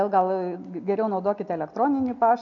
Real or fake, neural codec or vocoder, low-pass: real; none; 7.2 kHz